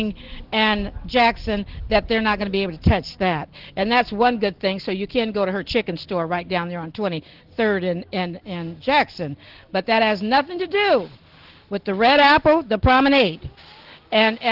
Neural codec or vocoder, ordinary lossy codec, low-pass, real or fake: none; Opus, 16 kbps; 5.4 kHz; real